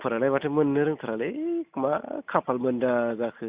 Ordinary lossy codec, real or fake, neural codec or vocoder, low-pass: Opus, 64 kbps; real; none; 3.6 kHz